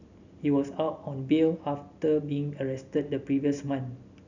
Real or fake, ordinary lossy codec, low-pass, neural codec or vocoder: real; none; 7.2 kHz; none